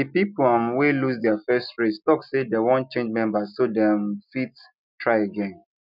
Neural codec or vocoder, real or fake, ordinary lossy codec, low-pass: none; real; none; 5.4 kHz